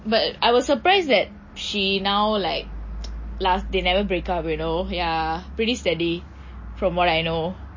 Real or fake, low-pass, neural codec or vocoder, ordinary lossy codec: real; 7.2 kHz; none; MP3, 32 kbps